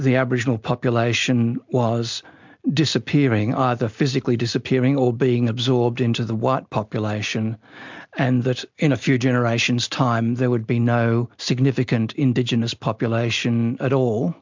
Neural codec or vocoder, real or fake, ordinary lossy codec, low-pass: none; real; MP3, 64 kbps; 7.2 kHz